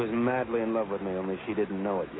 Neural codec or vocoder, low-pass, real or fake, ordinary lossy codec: none; 7.2 kHz; real; AAC, 16 kbps